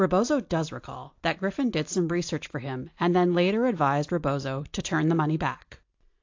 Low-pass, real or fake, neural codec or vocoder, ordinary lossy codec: 7.2 kHz; real; none; AAC, 48 kbps